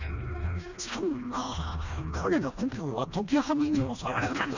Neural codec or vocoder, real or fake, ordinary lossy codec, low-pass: codec, 16 kHz, 1 kbps, FreqCodec, smaller model; fake; none; 7.2 kHz